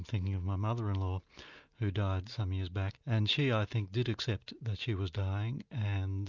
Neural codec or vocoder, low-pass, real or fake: none; 7.2 kHz; real